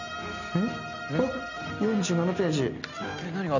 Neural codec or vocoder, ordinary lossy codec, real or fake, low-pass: none; none; real; 7.2 kHz